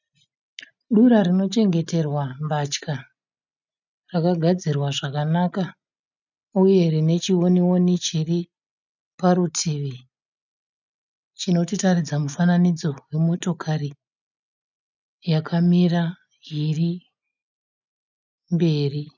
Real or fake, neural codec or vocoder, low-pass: real; none; 7.2 kHz